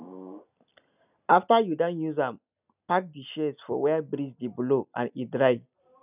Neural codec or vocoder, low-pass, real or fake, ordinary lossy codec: none; 3.6 kHz; real; none